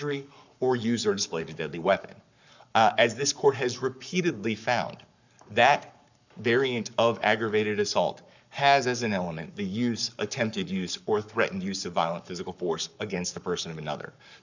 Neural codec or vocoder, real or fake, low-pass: codec, 44.1 kHz, 7.8 kbps, Pupu-Codec; fake; 7.2 kHz